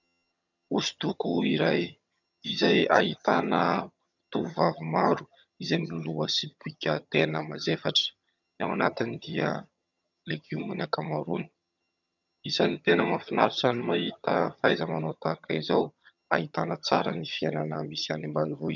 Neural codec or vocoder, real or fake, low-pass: vocoder, 22.05 kHz, 80 mel bands, HiFi-GAN; fake; 7.2 kHz